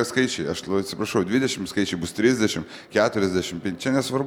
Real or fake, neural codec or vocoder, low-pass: real; none; 19.8 kHz